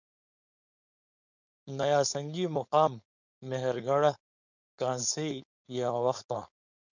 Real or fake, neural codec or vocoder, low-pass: fake; codec, 16 kHz, 4.8 kbps, FACodec; 7.2 kHz